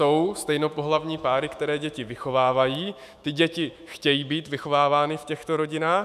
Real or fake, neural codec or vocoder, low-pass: fake; autoencoder, 48 kHz, 128 numbers a frame, DAC-VAE, trained on Japanese speech; 14.4 kHz